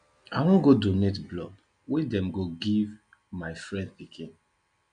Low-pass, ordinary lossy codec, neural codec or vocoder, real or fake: 9.9 kHz; none; none; real